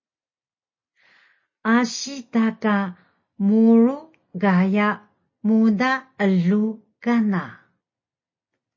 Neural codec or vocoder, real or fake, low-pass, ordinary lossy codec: none; real; 7.2 kHz; MP3, 32 kbps